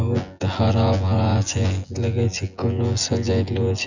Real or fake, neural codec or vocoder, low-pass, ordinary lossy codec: fake; vocoder, 24 kHz, 100 mel bands, Vocos; 7.2 kHz; none